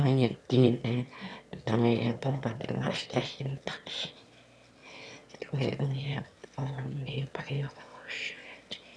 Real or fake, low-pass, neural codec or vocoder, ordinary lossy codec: fake; none; autoencoder, 22.05 kHz, a latent of 192 numbers a frame, VITS, trained on one speaker; none